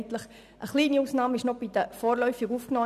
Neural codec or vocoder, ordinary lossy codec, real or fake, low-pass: none; none; real; 14.4 kHz